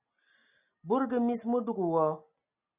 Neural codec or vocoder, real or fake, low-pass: none; real; 3.6 kHz